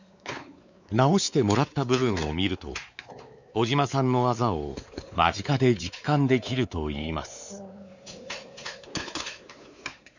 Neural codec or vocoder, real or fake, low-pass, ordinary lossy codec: codec, 16 kHz, 4 kbps, X-Codec, WavLM features, trained on Multilingual LibriSpeech; fake; 7.2 kHz; none